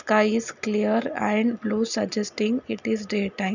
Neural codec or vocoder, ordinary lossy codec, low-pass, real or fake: none; none; 7.2 kHz; real